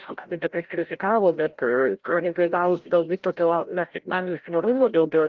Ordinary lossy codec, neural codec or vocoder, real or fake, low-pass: Opus, 16 kbps; codec, 16 kHz, 0.5 kbps, FreqCodec, larger model; fake; 7.2 kHz